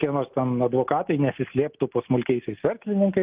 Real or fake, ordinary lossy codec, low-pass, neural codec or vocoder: real; Opus, 24 kbps; 3.6 kHz; none